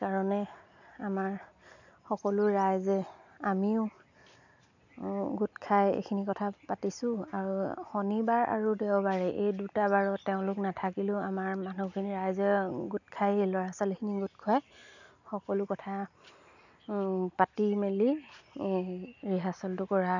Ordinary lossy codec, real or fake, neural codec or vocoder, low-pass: none; real; none; 7.2 kHz